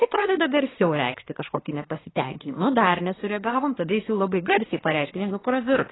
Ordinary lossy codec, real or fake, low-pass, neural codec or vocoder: AAC, 16 kbps; fake; 7.2 kHz; codec, 24 kHz, 1 kbps, SNAC